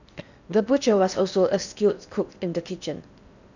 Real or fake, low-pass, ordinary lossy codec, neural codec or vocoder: fake; 7.2 kHz; none; codec, 16 kHz in and 24 kHz out, 0.8 kbps, FocalCodec, streaming, 65536 codes